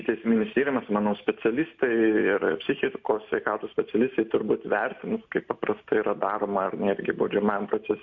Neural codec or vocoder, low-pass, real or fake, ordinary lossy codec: none; 7.2 kHz; real; MP3, 48 kbps